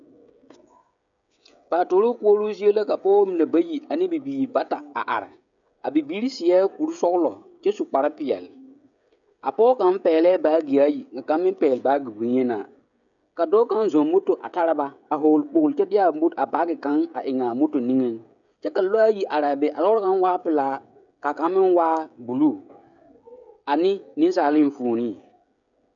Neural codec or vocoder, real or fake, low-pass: codec, 16 kHz, 16 kbps, FreqCodec, smaller model; fake; 7.2 kHz